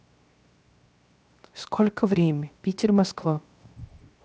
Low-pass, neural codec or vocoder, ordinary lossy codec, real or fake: none; codec, 16 kHz, 0.7 kbps, FocalCodec; none; fake